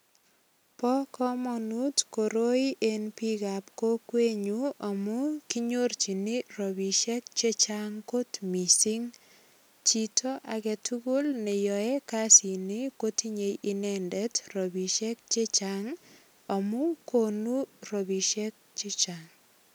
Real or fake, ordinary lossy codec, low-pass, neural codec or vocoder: real; none; none; none